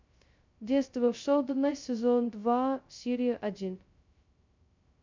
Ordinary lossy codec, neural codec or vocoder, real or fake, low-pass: MP3, 64 kbps; codec, 16 kHz, 0.2 kbps, FocalCodec; fake; 7.2 kHz